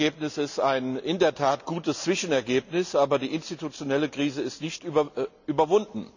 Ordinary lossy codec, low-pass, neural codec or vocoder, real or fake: none; 7.2 kHz; none; real